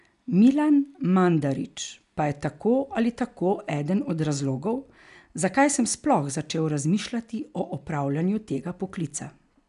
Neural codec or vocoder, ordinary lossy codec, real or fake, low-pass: none; none; real; 10.8 kHz